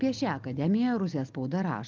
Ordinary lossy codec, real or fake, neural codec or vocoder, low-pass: Opus, 24 kbps; real; none; 7.2 kHz